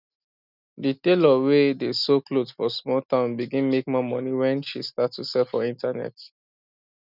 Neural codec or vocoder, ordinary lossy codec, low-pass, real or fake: none; none; 5.4 kHz; real